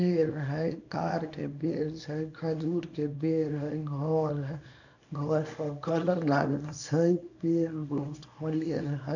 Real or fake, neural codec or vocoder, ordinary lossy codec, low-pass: fake; codec, 24 kHz, 0.9 kbps, WavTokenizer, small release; none; 7.2 kHz